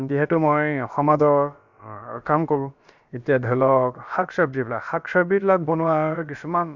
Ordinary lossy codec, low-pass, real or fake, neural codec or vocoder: Opus, 64 kbps; 7.2 kHz; fake; codec, 16 kHz, about 1 kbps, DyCAST, with the encoder's durations